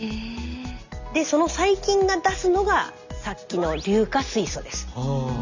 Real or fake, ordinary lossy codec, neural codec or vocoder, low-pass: real; Opus, 64 kbps; none; 7.2 kHz